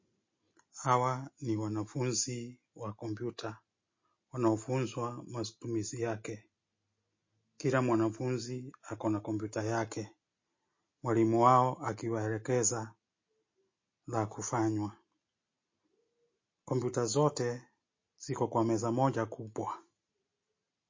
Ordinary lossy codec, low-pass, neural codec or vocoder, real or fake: MP3, 32 kbps; 7.2 kHz; none; real